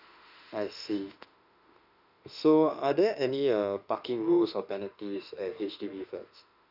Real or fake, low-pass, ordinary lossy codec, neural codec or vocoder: fake; 5.4 kHz; none; autoencoder, 48 kHz, 32 numbers a frame, DAC-VAE, trained on Japanese speech